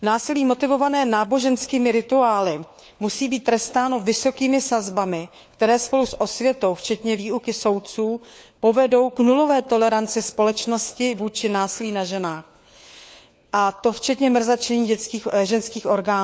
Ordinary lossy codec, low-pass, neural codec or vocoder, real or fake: none; none; codec, 16 kHz, 4 kbps, FunCodec, trained on LibriTTS, 50 frames a second; fake